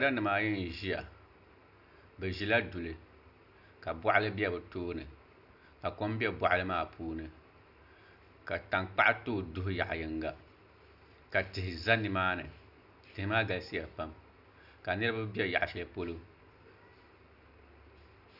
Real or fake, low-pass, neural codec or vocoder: real; 5.4 kHz; none